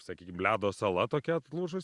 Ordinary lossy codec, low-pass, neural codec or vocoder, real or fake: Opus, 64 kbps; 10.8 kHz; none; real